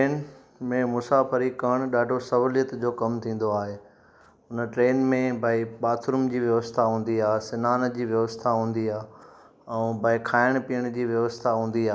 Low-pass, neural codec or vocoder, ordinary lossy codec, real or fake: none; none; none; real